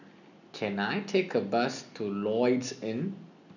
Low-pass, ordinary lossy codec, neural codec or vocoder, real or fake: 7.2 kHz; none; none; real